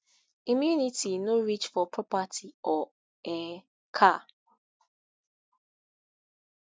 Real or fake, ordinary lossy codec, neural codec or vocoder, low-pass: real; none; none; none